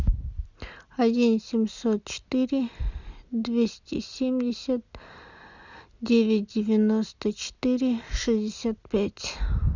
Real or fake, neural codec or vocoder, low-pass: real; none; 7.2 kHz